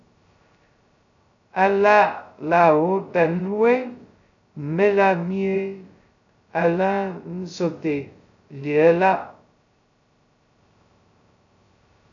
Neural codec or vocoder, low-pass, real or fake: codec, 16 kHz, 0.2 kbps, FocalCodec; 7.2 kHz; fake